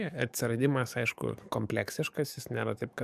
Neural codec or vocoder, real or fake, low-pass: codec, 44.1 kHz, 7.8 kbps, DAC; fake; 14.4 kHz